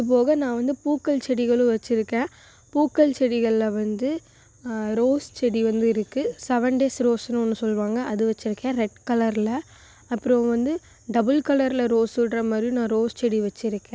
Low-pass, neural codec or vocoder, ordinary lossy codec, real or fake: none; none; none; real